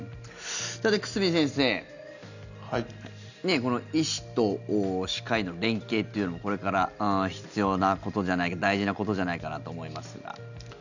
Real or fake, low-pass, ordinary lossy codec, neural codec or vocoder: real; 7.2 kHz; none; none